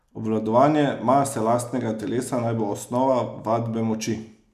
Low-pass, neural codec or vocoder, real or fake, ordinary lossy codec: 14.4 kHz; none; real; none